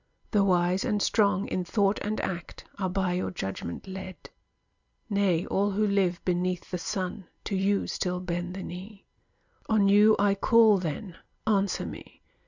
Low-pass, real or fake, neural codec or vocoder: 7.2 kHz; real; none